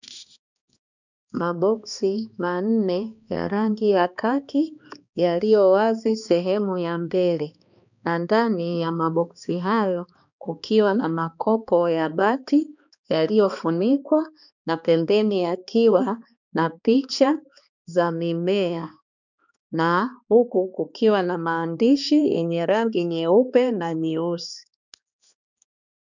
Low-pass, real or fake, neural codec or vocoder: 7.2 kHz; fake; codec, 16 kHz, 2 kbps, X-Codec, HuBERT features, trained on balanced general audio